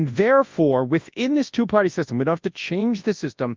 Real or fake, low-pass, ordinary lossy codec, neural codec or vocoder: fake; 7.2 kHz; Opus, 32 kbps; codec, 24 kHz, 0.9 kbps, WavTokenizer, large speech release